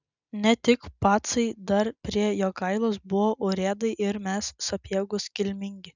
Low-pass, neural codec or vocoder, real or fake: 7.2 kHz; none; real